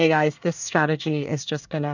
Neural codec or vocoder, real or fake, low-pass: codec, 24 kHz, 1 kbps, SNAC; fake; 7.2 kHz